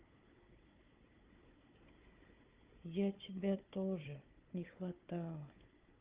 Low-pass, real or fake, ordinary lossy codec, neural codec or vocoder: 3.6 kHz; fake; Opus, 32 kbps; codec, 16 kHz, 4 kbps, FunCodec, trained on Chinese and English, 50 frames a second